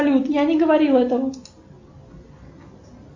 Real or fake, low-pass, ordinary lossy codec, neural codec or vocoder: real; 7.2 kHz; MP3, 48 kbps; none